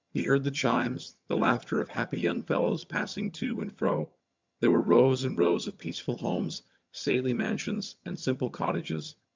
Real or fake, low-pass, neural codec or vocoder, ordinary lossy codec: fake; 7.2 kHz; vocoder, 22.05 kHz, 80 mel bands, HiFi-GAN; MP3, 64 kbps